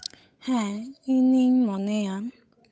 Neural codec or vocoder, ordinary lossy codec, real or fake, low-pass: codec, 16 kHz, 8 kbps, FunCodec, trained on Chinese and English, 25 frames a second; none; fake; none